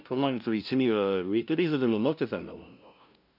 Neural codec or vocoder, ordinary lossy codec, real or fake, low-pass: codec, 16 kHz, 0.5 kbps, FunCodec, trained on LibriTTS, 25 frames a second; none; fake; 5.4 kHz